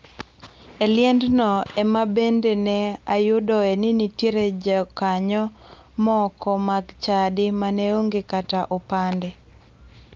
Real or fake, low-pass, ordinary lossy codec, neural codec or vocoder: real; 7.2 kHz; Opus, 24 kbps; none